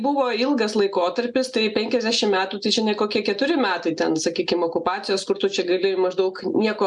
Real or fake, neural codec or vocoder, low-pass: real; none; 10.8 kHz